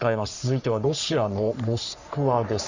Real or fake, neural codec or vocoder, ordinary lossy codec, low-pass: fake; codec, 44.1 kHz, 3.4 kbps, Pupu-Codec; Opus, 64 kbps; 7.2 kHz